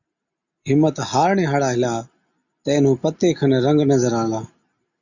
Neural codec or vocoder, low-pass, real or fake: none; 7.2 kHz; real